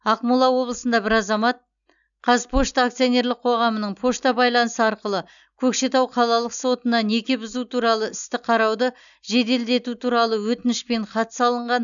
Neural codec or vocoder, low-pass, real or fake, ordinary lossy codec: none; 7.2 kHz; real; none